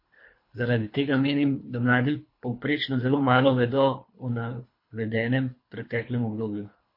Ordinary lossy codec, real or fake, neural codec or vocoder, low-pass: MP3, 32 kbps; fake; codec, 24 kHz, 3 kbps, HILCodec; 5.4 kHz